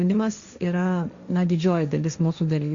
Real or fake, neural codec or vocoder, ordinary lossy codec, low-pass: fake; codec, 16 kHz, 1.1 kbps, Voila-Tokenizer; Opus, 64 kbps; 7.2 kHz